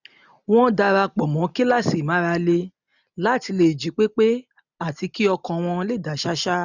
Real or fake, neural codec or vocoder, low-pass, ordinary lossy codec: real; none; 7.2 kHz; none